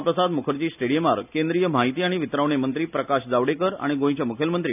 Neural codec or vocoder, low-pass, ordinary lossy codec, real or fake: none; 3.6 kHz; none; real